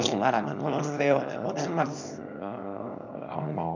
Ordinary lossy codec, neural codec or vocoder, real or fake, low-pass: none; codec, 24 kHz, 0.9 kbps, WavTokenizer, small release; fake; 7.2 kHz